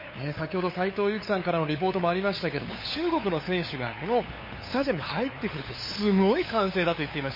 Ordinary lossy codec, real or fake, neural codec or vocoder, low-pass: MP3, 24 kbps; fake; codec, 16 kHz, 8 kbps, FunCodec, trained on LibriTTS, 25 frames a second; 5.4 kHz